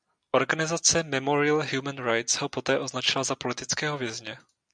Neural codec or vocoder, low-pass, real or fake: none; 9.9 kHz; real